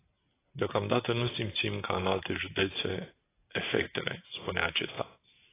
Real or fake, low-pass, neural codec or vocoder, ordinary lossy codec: real; 3.6 kHz; none; AAC, 16 kbps